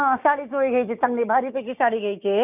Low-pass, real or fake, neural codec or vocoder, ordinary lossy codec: 3.6 kHz; fake; codec, 16 kHz, 6 kbps, DAC; none